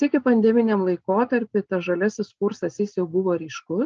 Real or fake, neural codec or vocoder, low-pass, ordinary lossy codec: real; none; 7.2 kHz; Opus, 24 kbps